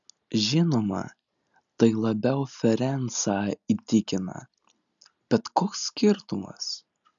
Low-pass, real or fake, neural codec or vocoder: 7.2 kHz; real; none